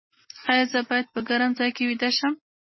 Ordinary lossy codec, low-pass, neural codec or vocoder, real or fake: MP3, 24 kbps; 7.2 kHz; none; real